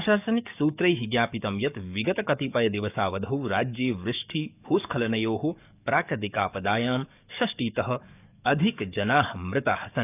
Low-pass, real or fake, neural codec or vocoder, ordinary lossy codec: 3.6 kHz; fake; codec, 44.1 kHz, 7.8 kbps, DAC; none